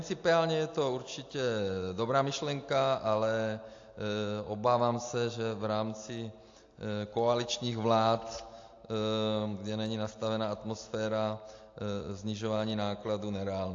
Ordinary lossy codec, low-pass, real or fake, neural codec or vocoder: AAC, 48 kbps; 7.2 kHz; real; none